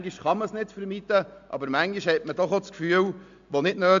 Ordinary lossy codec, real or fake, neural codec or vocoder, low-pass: MP3, 64 kbps; real; none; 7.2 kHz